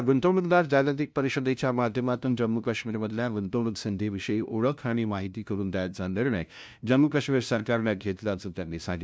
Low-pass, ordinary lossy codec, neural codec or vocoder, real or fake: none; none; codec, 16 kHz, 0.5 kbps, FunCodec, trained on LibriTTS, 25 frames a second; fake